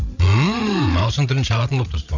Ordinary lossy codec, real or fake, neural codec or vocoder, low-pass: none; fake; codec, 16 kHz, 8 kbps, FreqCodec, larger model; 7.2 kHz